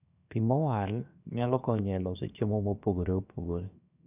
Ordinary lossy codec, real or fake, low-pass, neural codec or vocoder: none; fake; 3.6 kHz; codec, 16 kHz, 0.7 kbps, FocalCodec